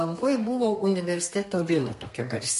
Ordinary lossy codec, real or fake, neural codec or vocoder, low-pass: MP3, 48 kbps; fake; codec, 32 kHz, 1.9 kbps, SNAC; 14.4 kHz